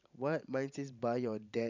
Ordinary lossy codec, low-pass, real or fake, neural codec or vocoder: none; 7.2 kHz; fake; vocoder, 44.1 kHz, 128 mel bands every 512 samples, BigVGAN v2